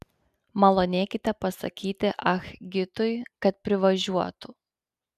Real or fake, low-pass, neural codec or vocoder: real; 14.4 kHz; none